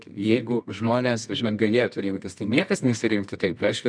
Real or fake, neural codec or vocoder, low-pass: fake; codec, 24 kHz, 0.9 kbps, WavTokenizer, medium music audio release; 9.9 kHz